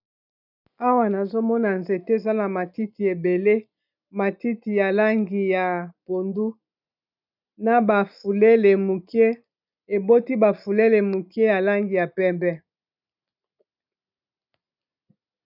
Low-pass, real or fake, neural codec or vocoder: 5.4 kHz; real; none